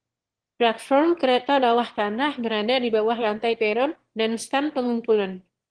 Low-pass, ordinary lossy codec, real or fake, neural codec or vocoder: 9.9 kHz; Opus, 16 kbps; fake; autoencoder, 22.05 kHz, a latent of 192 numbers a frame, VITS, trained on one speaker